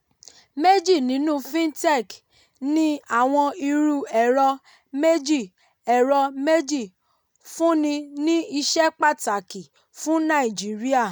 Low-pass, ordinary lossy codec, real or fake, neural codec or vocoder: 19.8 kHz; none; real; none